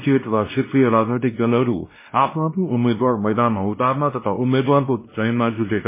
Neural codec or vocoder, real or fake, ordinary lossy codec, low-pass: codec, 16 kHz, 1 kbps, X-Codec, WavLM features, trained on Multilingual LibriSpeech; fake; MP3, 16 kbps; 3.6 kHz